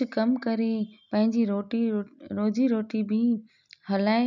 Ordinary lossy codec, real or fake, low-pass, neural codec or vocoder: none; real; 7.2 kHz; none